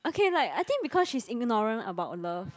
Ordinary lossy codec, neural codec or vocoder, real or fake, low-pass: none; none; real; none